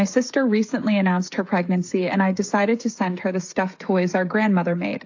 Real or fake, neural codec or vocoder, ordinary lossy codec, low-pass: fake; codec, 16 kHz, 16 kbps, FreqCodec, smaller model; AAC, 48 kbps; 7.2 kHz